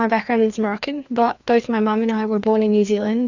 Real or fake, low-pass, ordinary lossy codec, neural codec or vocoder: fake; 7.2 kHz; Opus, 64 kbps; codec, 16 kHz, 2 kbps, FreqCodec, larger model